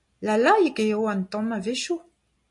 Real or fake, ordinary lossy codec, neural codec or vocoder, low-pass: real; MP3, 48 kbps; none; 10.8 kHz